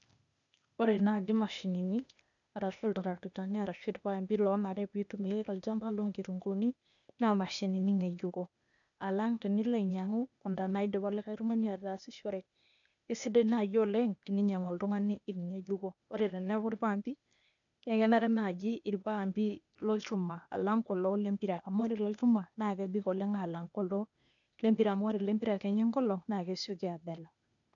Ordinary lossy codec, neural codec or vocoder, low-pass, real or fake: none; codec, 16 kHz, 0.8 kbps, ZipCodec; 7.2 kHz; fake